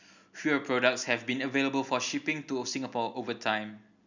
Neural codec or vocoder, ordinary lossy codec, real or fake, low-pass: none; none; real; 7.2 kHz